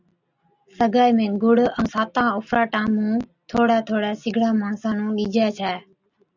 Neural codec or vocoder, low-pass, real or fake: none; 7.2 kHz; real